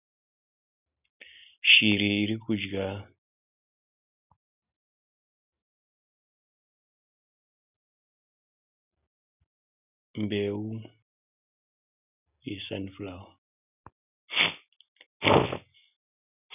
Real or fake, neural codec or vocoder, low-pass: real; none; 3.6 kHz